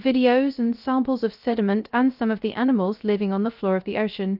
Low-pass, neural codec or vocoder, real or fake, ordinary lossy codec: 5.4 kHz; codec, 16 kHz, 0.3 kbps, FocalCodec; fake; Opus, 24 kbps